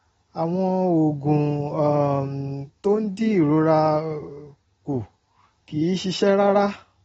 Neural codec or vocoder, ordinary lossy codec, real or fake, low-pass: none; AAC, 24 kbps; real; 19.8 kHz